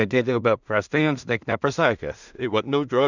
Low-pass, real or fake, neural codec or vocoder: 7.2 kHz; fake; codec, 16 kHz in and 24 kHz out, 0.4 kbps, LongCat-Audio-Codec, two codebook decoder